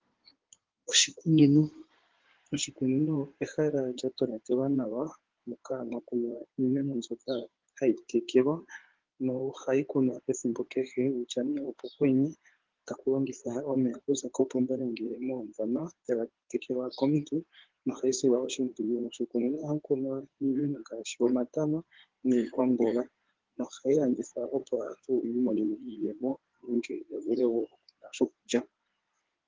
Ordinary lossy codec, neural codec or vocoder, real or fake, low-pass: Opus, 16 kbps; codec, 16 kHz in and 24 kHz out, 2.2 kbps, FireRedTTS-2 codec; fake; 7.2 kHz